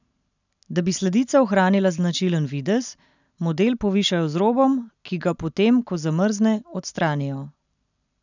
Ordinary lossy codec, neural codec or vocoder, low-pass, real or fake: none; none; 7.2 kHz; real